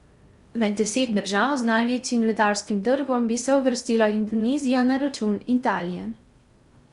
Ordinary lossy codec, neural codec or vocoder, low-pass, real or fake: Opus, 64 kbps; codec, 16 kHz in and 24 kHz out, 0.6 kbps, FocalCodec, streaming, 4096 codes; 10.8 kHz; fake